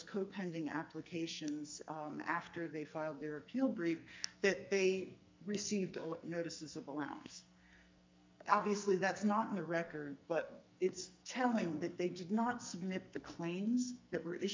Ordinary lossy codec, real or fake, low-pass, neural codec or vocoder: MP3, 64 kbps; fake; 7.2 kHz; codec, 44.1 kHz, 2.6 kbps, SNAC